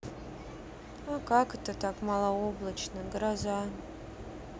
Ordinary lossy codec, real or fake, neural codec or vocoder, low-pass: none; real; none; none